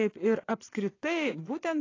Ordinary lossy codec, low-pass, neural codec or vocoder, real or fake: AAC, 32 kbps; 7.2 kHz; vocoder, 22.05 kHz, 80 mel bands, Vocos; fake